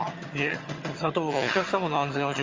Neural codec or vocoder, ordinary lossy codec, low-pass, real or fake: vocoder, 22.05 kHz, 80 mel bands, HiFi-GAN; Opus, 32 kbps; 7.2 kHz; fake